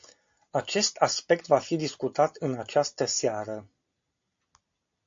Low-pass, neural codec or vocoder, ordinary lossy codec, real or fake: 7.2 kHz; none; MP3, 48 kbps; real